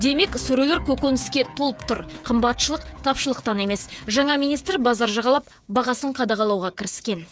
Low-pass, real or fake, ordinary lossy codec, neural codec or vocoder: none; fake; none; codec, 16 kHz, 8 kbps, FreqCodec, smaller model